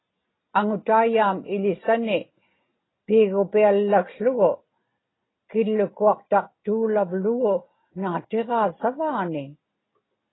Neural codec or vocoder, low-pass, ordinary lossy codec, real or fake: none; 7.2 kHz; AAC, 16 kbps; real